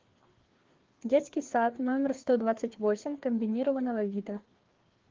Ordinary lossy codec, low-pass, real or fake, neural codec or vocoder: Opus, 16 kbps; 7.2 kHz; fake; codec, 16 kHz, 2 kbps, FreqCodec, larger model